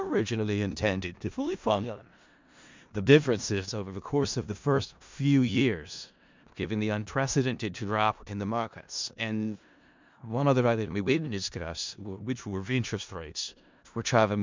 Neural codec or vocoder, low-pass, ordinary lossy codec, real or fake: codec, 16 kHz in and 24 kHz out, 0.4 kbps, LongCat-Audio-Codec, four codebook decoder; 7.2 kHz; MP3, 64 kbps; fake